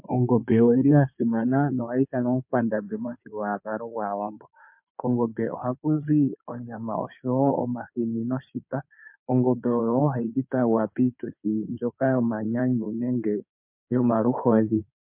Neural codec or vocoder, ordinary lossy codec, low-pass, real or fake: codec, 16 kHz in and 24 kHz out, 2.2 kbps, FireRedTTS-2 codec; MP3, 32 kbps; 3.6 kHz; fake